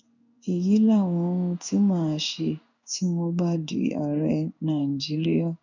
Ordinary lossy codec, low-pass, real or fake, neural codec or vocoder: none; 7.2 kHz; fake; codec, 16 kHz in and 24 kHz out, 1 kbps, XY-Tokenizer